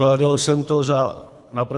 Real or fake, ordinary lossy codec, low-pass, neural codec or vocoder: fake; Opus, 64 kbps; 10.8 kHz; codec, 24 kHz, 3 kbps, HILCodec